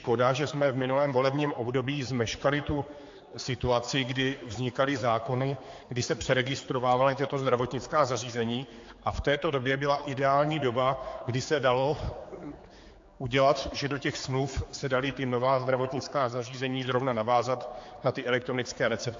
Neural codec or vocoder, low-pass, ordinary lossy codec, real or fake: codec, 16 kHz, 4 kbps, X-Codec, HuBERT features, trained on general audio; 7.2 kHz; AAC, 48 kbps; fake